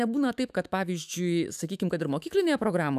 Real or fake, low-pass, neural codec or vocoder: fake; 14.4 kHz; autoencoder, 48 kHz, 128 numbers a frame, DAC-VAE, trained on Japanese speech